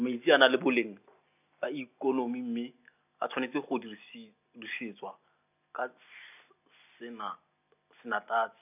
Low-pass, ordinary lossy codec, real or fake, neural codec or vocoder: 3.6 kHz; none; real; none